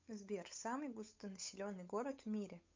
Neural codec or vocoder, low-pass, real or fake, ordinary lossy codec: codec, 16 kHz, 8 kbps, FunCodec, trained on Chinese and English, 25 frames a second; 7.2 kHz; fake; MP3, 64 kbps